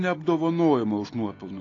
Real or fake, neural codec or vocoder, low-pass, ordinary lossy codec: real; none; 7.2 kHz; AAC, 32 kbps